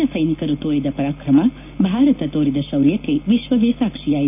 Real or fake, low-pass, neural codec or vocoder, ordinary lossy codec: real; 3.6 kHz; none; none